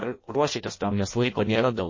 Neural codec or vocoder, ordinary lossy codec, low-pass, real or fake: codec, 16 kHz in and 24 kHz out, 0.6 kbps, FireRedTTS-2 codec; MP3, 32 kbps; 7.2 kHz; fake